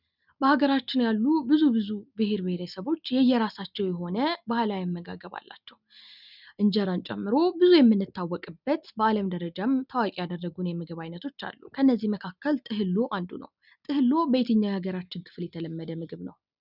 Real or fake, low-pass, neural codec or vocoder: real; 5.4 kHz; none